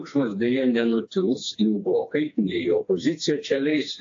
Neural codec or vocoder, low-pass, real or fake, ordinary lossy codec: codec, 16 kHz, 2 kbps, FreqCodec, smaller model; 7.2 kHz; fake; AAC, 48 kbps